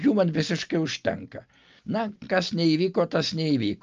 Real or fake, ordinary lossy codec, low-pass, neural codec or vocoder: real; Opus, 24 kbps; 7.2 kHz; none